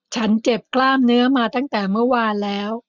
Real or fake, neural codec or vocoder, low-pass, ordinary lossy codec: real; none; 7.2 kHz; none